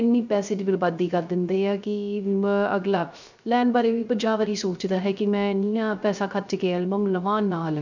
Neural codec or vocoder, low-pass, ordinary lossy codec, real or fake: codec, 16 kHz, 0.3 kbps, FocalCodec; 7.2 kHz; none; fake